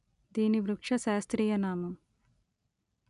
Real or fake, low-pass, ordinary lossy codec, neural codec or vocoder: real; 10.8 kHz; none; none